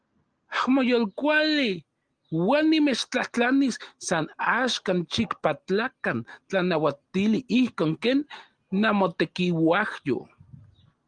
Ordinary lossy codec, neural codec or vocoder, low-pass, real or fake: Opus, 24 kbps; none; 9.9 kHz; real